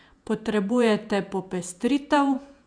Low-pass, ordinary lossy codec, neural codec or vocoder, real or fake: 9.9 kHz; none; vocoder, 48 kHz, 128 mel bands, Vocos; fake